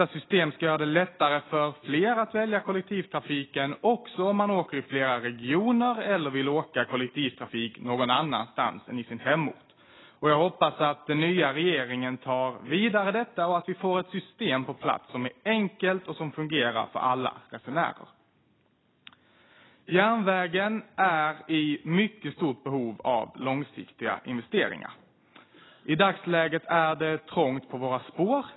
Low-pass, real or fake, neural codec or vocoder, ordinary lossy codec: 7.2 kHz; real; none; AAC, 16 kbps